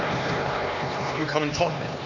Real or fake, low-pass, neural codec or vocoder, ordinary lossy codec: fake; 7.2 kHz; codec, 16 kHz, 2 kbps, X-Codec, HuBERT features, trained on LibriSpeech; none